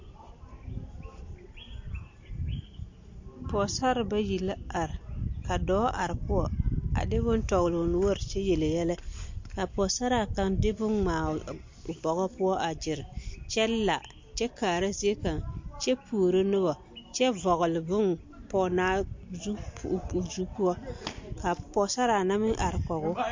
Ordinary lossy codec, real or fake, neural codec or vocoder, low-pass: MP3, 48 kbps; real; none; 7.2 kHz